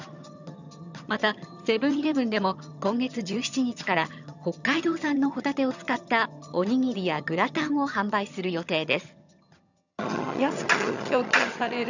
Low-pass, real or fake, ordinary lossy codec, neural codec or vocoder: 7.2 kHz; fake; none; vocoder, 22.05 kHz, 80 mel bands, HiFi-GAN